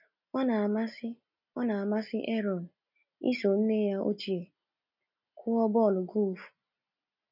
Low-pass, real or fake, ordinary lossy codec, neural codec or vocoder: 5.4 kHz; real; none; none